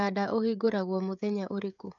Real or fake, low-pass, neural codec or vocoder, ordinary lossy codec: fake; 7.2 kHz; codec, 16 kHz, 16 kbps, FreqCodec, smaller model; none